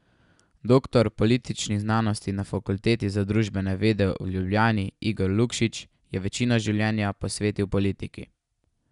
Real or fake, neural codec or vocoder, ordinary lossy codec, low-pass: real; none; none; 10.8 kHz